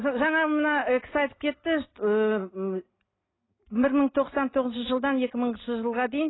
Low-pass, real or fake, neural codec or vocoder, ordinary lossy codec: 7.2 kHz; real; none; AAC, 16 kbps